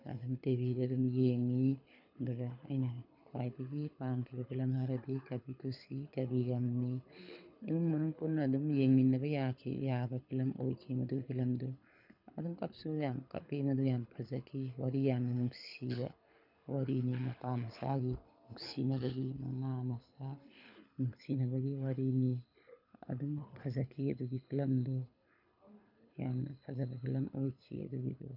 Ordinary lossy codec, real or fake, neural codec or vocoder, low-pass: none; fake; codec, 24 kHz, 6 kbps, HILCodec; 5.4 kHz